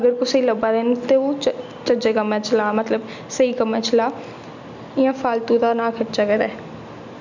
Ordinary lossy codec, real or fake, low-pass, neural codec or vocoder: none; real; 7.2 kHz; none